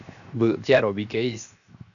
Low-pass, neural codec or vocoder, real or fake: 7.2 kHz; codec, 16 kHz, 0.7 kbps, FocalCodec; fake